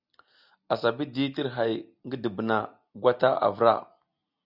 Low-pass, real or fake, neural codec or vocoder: 5.4 kHz; real; none